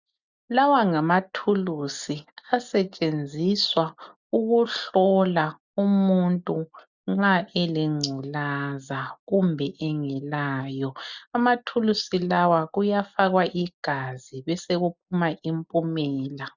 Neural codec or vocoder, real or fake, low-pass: none; real; 7.2 kHz